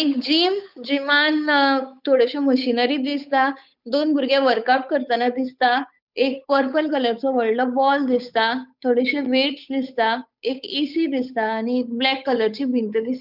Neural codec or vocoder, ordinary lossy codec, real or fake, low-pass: codec, 16 kHz, 8 kbps, FunCodec, trained on Chinese and English, 25 frames a second; none; fake; 5.4 kHz